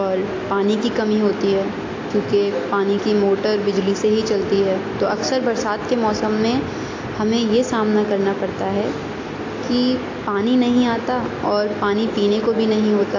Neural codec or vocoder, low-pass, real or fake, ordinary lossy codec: none; 7.2 kHz; real; AAC, 32 kbps